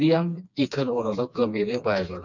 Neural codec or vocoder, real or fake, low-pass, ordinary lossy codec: codec, 16 kHz, 2 kbps, FreqCodec, smaller model; fake; 7.2 kHz; none